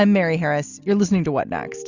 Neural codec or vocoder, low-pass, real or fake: none; 7.2 kHz; real